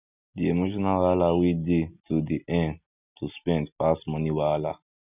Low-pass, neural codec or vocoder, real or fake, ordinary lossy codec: 3.6 kHz; none; real; none